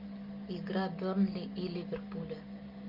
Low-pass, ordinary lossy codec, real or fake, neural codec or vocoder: 5.4 kHz; Opus, 24 kbps; real; none